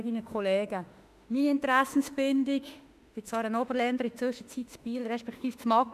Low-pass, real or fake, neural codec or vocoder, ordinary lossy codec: 14.4 kHz; fake; autoencoder, 48 kHz, 32 numbers a frame, DAC-VAE, trained on Japanese speech; none